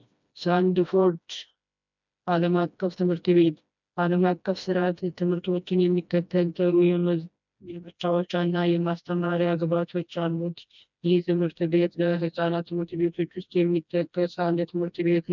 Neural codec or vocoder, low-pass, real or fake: codec, 16 kHz, 1 kbps, FreqCodec, smaller model; 7.2 kHz; fake